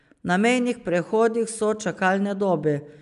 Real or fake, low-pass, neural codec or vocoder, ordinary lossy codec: real; 10.8 kHz; none; none